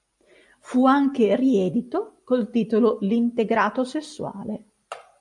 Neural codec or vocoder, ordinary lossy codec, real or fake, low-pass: none; MP3, 96 kbps; real; 10.8 kHz